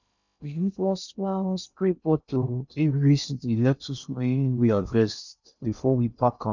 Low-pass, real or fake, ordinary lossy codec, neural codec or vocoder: 7.2 kHz; fake; none; codec, 16 kHz in and 24 kHz out, 0.6 kbps, FocalCodec, streaming, 2048 codes